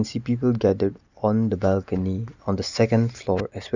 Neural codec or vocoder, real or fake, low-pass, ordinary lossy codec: none; real; 7.2 kHz; none